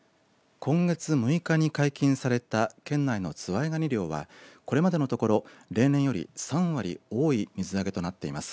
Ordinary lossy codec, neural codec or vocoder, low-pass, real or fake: none; none; none; real